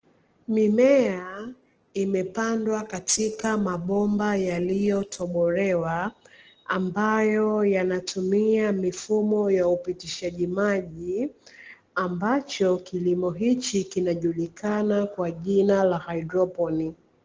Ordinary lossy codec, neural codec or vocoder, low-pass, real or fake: Opus, 16 kbps; none; 7.2 kHz; real